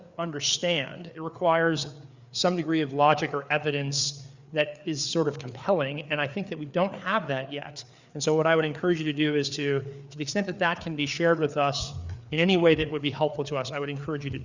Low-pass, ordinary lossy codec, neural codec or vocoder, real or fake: 7.2 kHz; Opus, 64 kbps; codec, 16 kHz, 4 kbps, FreqCodec, larger model; fake